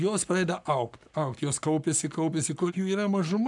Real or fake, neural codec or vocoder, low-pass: fake; codec, 44.1 kHz, 7.8 kbps, Pupu-Codec; 10.8 kHz